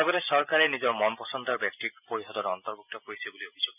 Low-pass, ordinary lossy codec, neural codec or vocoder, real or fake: 3.6 kHz; none; none; real